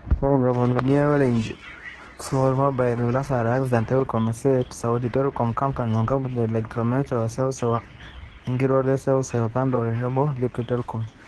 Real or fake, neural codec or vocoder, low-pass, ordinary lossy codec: fake; codec, 24 kHz, 0.9 kbps, WavTokenizer, medium speech release version 2; 10.8 kHz; Opus, 16 kbps